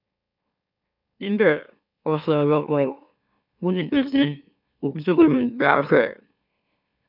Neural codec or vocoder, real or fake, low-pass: autoencoder, 44.1 kHz, a latent of 192 numbers a frame, MeloTTS; fake; 5.4 kHz